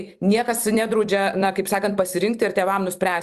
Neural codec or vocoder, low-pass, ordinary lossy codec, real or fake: vocoder, 44.1 kHz, 128 mel bands every 256 samples, BigVGAN v2; 14.4 kHz; Opus, 64 kbps; fake